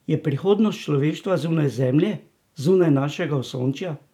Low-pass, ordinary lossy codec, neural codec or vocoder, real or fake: 19.8 kHz; none; vocoder, 44.1 kHz, 128 mel bands every 512 samples, BigVGAN v2; fake